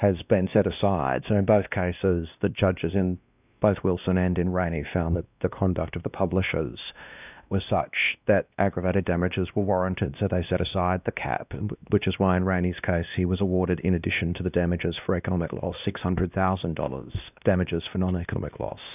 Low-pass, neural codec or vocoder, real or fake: 3.6 kHz; codec, 16 kHz, 1 kbps, X-Codec, WavLM features, trained on Multilingual LibriSpeech; fake